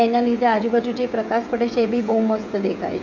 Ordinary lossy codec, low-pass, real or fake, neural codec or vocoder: none; 7.2 kHz; fake; codec, 16 kHz, 16 kbps, FreqCodec, smaller model